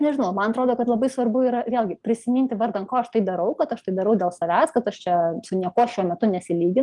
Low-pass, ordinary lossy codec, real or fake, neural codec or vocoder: 10.8 kHz; Opus, 24 kbps; real; none